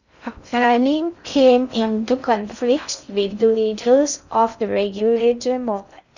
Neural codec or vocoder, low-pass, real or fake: codec, 16 kHz in and 24 kHz out, 0.6 kbps, FocalCodec, streaming, 2048 codes; 7.2 kHz; fake